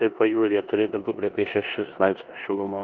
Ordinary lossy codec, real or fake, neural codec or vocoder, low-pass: Opus, 32 kbps; fake; codec, 16 kHz in and 24 kHz out, 0.9 kbps, LongCat-Audio-Codec, four codebook decoder; 7.2 kHz